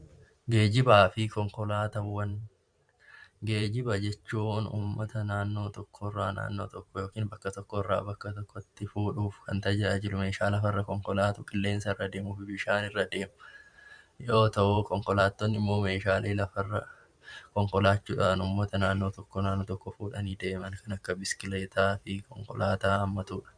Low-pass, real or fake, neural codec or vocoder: 9.9 kHz; fake; vocoder, 44.1 kHz, 128 mel bands every 512 samples, BigVGAN v2